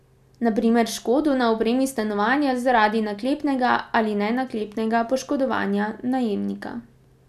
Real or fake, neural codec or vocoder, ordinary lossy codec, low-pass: real; none; none; 14.4 kHz